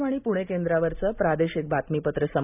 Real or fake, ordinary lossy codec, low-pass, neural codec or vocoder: real; none; 3.6 kHz; none